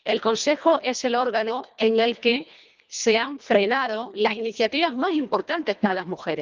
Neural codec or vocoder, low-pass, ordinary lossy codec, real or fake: codec, 24 kHz, 1.5 kbps, HILCodec; 7.2 kHz; Opus, 24 kbps; fake